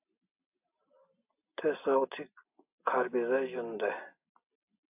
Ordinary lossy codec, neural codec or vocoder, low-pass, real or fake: AAC, 32 kbps; none; 3.6 kHz; real